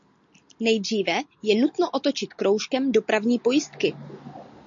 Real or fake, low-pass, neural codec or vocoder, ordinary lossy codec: real; 7.2 kHz; none; MP3, 48 kbps